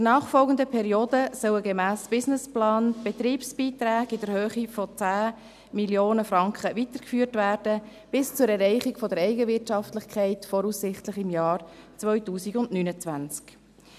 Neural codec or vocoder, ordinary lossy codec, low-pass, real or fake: none; none; 14.4 kHz; real